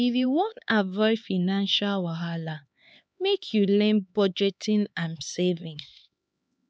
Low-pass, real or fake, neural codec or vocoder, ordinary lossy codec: none; fake; codec, 16 kHz, 4 kbps, X-Codec, HuBERT features, trained on LibriSpeech; none